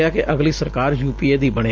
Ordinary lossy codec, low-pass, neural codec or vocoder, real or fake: Opus, 32 kbps; 7.2 kHz; none; real